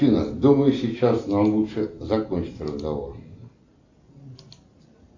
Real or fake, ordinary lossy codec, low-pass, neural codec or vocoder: real; AAC, 48 kbps; 7.2 kHz; none